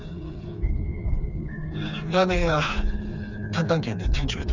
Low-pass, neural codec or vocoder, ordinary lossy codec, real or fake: 7.2 kHz; codec, 16 kHz, 4 kbps, FreqCodec, smaller model; none; fake